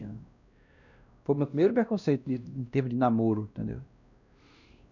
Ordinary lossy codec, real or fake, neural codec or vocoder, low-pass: none; fake; codec, 16 kHz, 1 kbps, X-Codec, WavLM features, trained on Multilingual LibriSpeech; 7.2 kHz